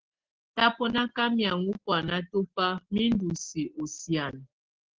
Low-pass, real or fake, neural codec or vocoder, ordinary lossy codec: 7.2 kHz; real; none; Opus, 16 kbps